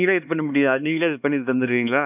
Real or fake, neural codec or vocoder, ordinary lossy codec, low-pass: fake; codec, 16 kHz, 2 kbps, X-Codec, HuBERT features, trained on LibriSpeech; none; 3.6 kHz